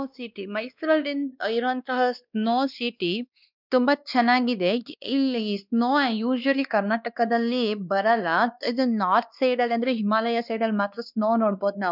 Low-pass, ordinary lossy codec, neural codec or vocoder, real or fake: 5.4 kHz; none; codec, 16 kHz, 2 kbps, X-Codec, WavLM features, trained on Multilingual LibriSpeech; fake